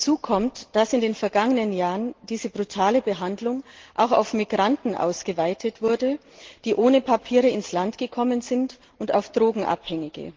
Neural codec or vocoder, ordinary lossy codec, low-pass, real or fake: none; Opus, 16 kbps; 7.2 kHz; real